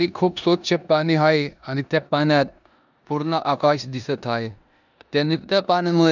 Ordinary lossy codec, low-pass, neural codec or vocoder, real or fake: none; 7.2 kHz; codec, 16 kHz in and 24 kHz out, 0.9 kbps, LongCat-Audio-Codec, four codebook decoder; fake